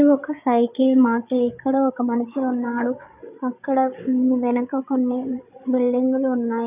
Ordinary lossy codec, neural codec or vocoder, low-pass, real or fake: none; codec, 16 kHz, 8 kbps, FreqCodec, larger model; 3.6 kHz; fake